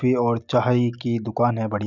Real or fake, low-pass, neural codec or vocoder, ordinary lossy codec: real; 7.2 kHz; none; none